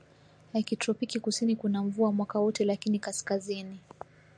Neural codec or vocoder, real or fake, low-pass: none; real; 9.9 kHz